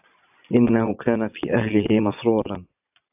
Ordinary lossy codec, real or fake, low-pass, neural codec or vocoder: AAC, 32 kbps; fake; 3.6 kHz; vocoder, 22.05 kHz, 80 mel bands, Vocos